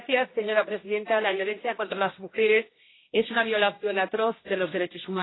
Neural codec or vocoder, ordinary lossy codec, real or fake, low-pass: codec, 16 kHz, 1 kbps, X-Codec, HuBERT features, trained on general audio; AAC, 16 kbps; fake; 7.2 kHz